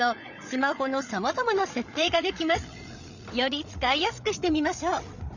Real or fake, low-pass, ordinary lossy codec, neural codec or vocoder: fake; 7.2 kHz; none; codec, 16 kHz, 8 kbps, FreqCodec, larger model